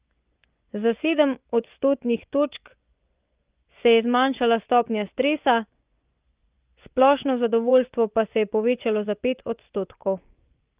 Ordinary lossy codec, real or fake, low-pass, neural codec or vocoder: Opus, 24 kbps; fake; 3.6 kHz; vocoder, 24 kHz, 100 mel bands, Vocos